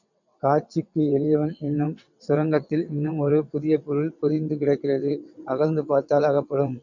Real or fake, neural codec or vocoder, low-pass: fake; vocoder, 22.05 kHz, 80 mel bands, WaveNeXt; 7.2 kHz